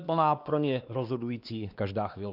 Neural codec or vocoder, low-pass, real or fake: codec, 16 kHz, 2 kbps, X-Codec, WavLM features, trained on Multilingual LibriSpeech; 5.4 kHz; fake